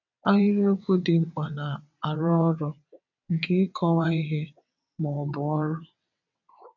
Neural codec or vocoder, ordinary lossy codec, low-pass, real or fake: vocoder, 22.05 kHz, 80 mel bands, WaveNeXt; none; 7.2 kHz; fake